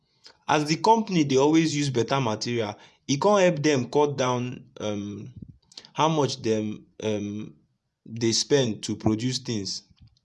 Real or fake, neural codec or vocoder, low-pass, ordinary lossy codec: fake; vocoder, 24 kHz, 100 mel bands, Vocos; none; none